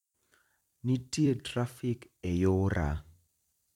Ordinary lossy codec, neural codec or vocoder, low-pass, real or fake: none; vocoder, 44.1 kHz, 128 mel bands every 256 samples, BigVGAN v2; 19.8 kHz; fake